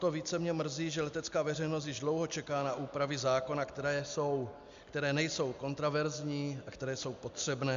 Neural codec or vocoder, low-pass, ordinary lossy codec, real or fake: none; 7.2 kHz; MP3, 64 kbps; real